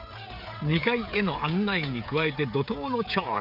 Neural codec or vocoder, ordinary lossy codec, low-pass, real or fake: codec, 16 kHz, 16 kbps, FreqCodec, smaller model; none; 5.4 kHz; fake